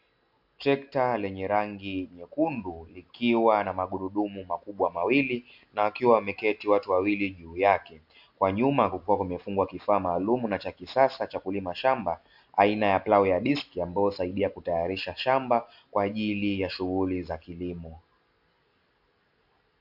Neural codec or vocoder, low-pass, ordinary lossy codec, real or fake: none; 5.4 kHz; AAC, 48 kbps; real